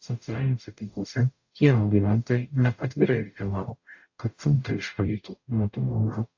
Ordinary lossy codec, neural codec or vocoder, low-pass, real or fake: AAC, 48 kbps; codec, 44.1 kHz, 0.9 kbps, DAC; 7.2 kHz; fake